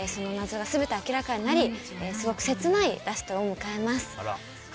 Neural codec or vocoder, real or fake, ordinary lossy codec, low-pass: none; real; none; none